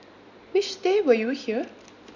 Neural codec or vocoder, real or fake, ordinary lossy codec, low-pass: none; real; none; 7.2 kHz